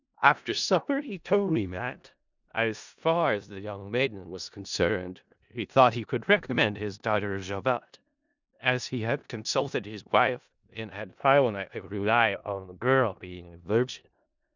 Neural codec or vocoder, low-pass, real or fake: codec, 16 kHz in and 24 kHz out, 0.4 kbps, LongCat-Audio-Codec, four codebook decoder; 7.2 kHz; fake